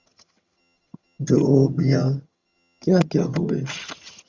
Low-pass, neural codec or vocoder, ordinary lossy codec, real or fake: 7.2 kHz; vocoder, 22.05 kHz, 80 mel bands, HiFi-GAN; Opus, 64 kbps; fake